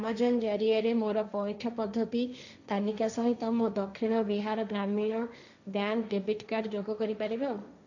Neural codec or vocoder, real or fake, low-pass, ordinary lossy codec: codec, 16 kHz, 1.1 kbps, Voila-Tokenizer; fake; 7.2 kHz; none